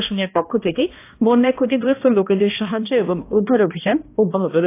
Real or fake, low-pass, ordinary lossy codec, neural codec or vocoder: fake; 3.6 kHz; AAC, 24 kbps; codec, 16 kHz, 1 kbps, X-Codec, HuBERT features, trained on balanced general audio